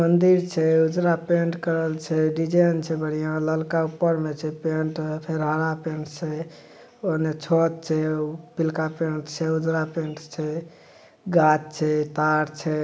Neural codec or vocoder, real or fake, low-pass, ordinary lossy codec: none; real; none; none